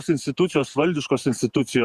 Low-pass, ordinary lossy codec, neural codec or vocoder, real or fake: 14.4 kHz; Opus, 64 kbps; vocoder, 48 kHz, 128 mel bands, Vocos; fake